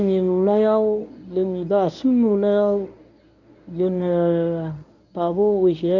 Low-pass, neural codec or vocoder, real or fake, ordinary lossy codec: 7.2 kHz; codec, 24 kHz, 0.9 kbps, WavTokenizer, medium speech release version 1; fake; none